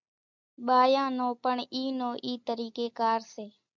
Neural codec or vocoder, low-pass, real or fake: none; 7.2 kHz; real